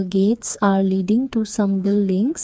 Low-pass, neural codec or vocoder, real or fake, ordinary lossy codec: none; codec, 16 kHz, 4 kbps, FreqCodec, smaller model; fake; none